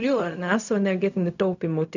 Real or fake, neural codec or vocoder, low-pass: fake; codec, 16 kHz, 0.4 kbps, LongCat-Audio-Codec; 7.2 kHz